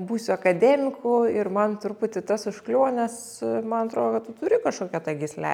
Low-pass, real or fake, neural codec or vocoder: 19.8 kHz; real; none